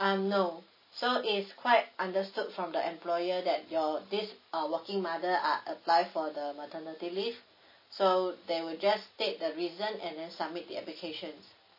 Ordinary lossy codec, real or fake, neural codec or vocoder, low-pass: MP3, 24 kbps; real; none; 5.4 kHz